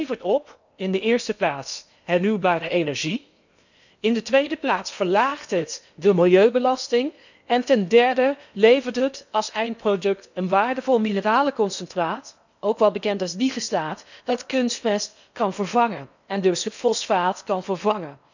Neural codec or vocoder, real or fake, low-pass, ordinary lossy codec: codec, 16 kHz in and 24 kHz out, 0.8 kbps, FocalCodec, streaming, 65536 codes; fake; 7.2 kHz; none